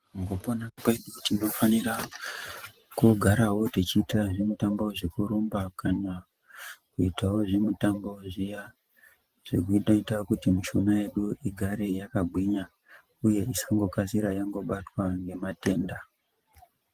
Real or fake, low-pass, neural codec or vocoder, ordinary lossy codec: fake; 14.4 kHz; vocoder, 44.1 kHz, 128 mel bands every 512 samples, BigVGAN v2; Opus, 24 kbps